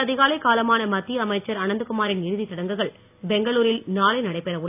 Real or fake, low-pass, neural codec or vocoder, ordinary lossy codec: real; 3.6 kHz; none; AAC, 32 kbps